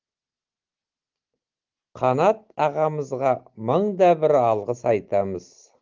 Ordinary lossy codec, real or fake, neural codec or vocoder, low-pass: Opus, 16 kbps; real; none; 7.2 kHz